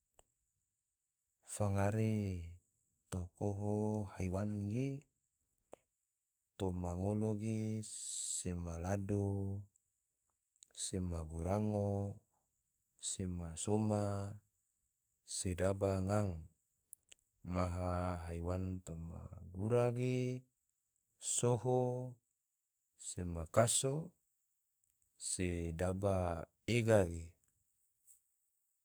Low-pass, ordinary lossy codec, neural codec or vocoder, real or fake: none; none; codec, 44.1 kHz, 2.6 kbps, SNAC; fake